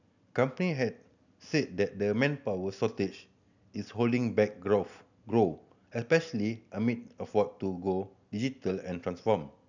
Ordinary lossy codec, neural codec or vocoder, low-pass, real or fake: none; none; 7.2 kHz; real